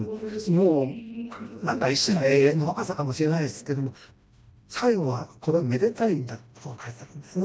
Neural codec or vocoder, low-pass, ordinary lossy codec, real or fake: codec, 16 kHz, 1 kbps, FreqCodec, smaller model; none; none; fake